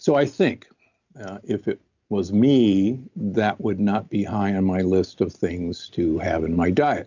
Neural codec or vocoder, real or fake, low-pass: none; real; 7.2 kHz